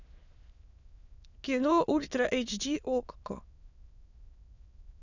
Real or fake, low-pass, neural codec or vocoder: fake; 7.2 kHz; autoencoder, 22.05 kHz, a latent of 192 numbers a frame, VITS, trained on many speakers